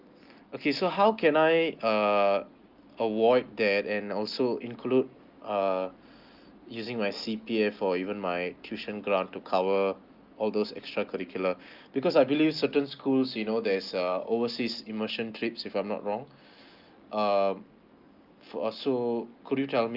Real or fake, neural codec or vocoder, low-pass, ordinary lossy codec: real; none; 5.4 kHz; Opus, 24 kbps